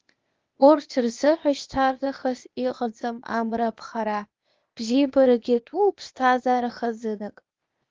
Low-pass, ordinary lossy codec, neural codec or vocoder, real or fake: 7.2 kHz; Opus, 24 kbps; codec, 16 kHz, 0.8 kbps, ZipCodec; fake